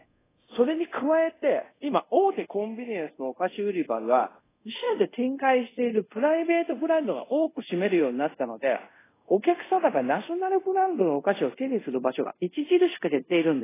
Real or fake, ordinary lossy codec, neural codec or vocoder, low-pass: fake; AAC, 16 kbps; codec, 24 kHz, 0.5 kbps, DualCodec; 3.6 kHz